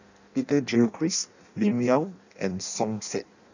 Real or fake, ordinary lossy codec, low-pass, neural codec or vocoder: fake; none; 7.2 kHz; codec, 16 kHz in and 24 kHz out, 0.6 kbps, FireRedTTS-2 codec